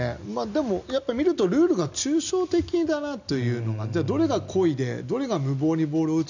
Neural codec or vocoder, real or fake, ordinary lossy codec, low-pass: none; real; none; 7.2 kHz